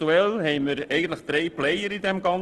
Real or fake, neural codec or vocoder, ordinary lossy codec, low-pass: real; none; Opus, 16 kbps; 10.8 kHz